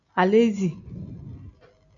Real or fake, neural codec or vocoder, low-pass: real; none; 7.2 kHz